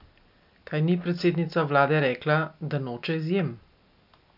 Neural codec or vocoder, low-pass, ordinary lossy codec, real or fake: none; 5.4 kHz; none; real